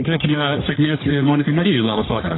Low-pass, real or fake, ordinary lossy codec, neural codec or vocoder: 7.2 kHz; fake; AAC, 16 kbps; codec, 44.1 kHz, 1.7 kbps, Pupu-Codec